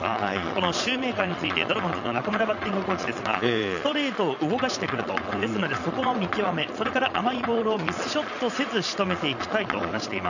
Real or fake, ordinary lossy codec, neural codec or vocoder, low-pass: fake; none; vocoder, 22.05 kHz, 80 mel bands, Vocos; 7.2 kHz